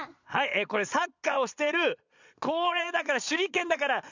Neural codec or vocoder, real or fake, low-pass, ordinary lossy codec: vocoder, 22.05 kHz, 80 mel bands, Vocos; fake; 7.2 kHz; none